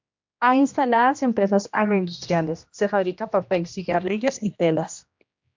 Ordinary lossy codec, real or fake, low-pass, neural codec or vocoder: MP3, 64 kbps; fake; 7.2 kHz; codec, 16 kHz, 1 kbps, X-Codec, HuBERT features, trained on general audio